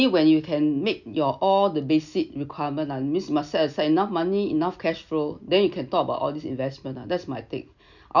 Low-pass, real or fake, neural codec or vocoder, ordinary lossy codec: 7.2 kHz; real; none; none